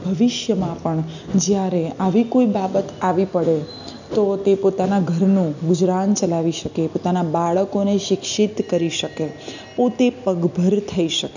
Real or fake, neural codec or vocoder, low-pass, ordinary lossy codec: real; none; 7.2 kHz; none